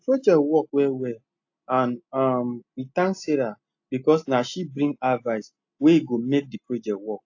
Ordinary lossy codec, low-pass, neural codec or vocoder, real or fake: AAC, 48 kbps; 7.2 kHz; none; real